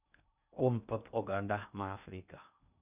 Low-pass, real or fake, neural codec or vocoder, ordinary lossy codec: 3.6 kHz; fake; codec, 16 kHz in and 24 kHz out, 0.6 kbps, FocalCodec, streaming, 2048 codes; none